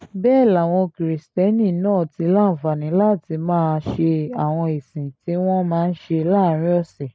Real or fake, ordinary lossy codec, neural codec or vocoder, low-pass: real; none; none; none